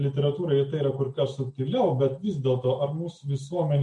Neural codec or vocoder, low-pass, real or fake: none; 10.8 kHz; real